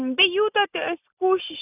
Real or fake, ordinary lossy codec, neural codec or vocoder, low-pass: real; none; none; 3.6 kHz